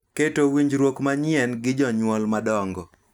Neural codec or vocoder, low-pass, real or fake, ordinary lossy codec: none; 19.8 kHz; real; none